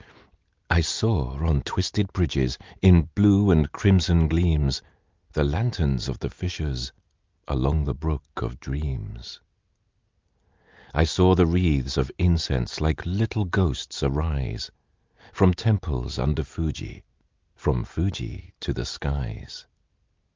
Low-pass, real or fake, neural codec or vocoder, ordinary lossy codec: 7.2 kHz; real; none; Opus, 32 kbps